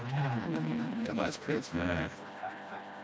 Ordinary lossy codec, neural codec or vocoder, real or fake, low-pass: none; codec, 16 kHz, 1 kbps, FreqCodec, smaller model; fake; none